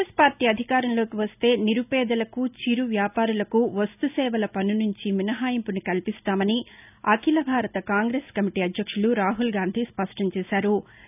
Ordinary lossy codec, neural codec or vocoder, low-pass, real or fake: none; none; 3.6 kHz; real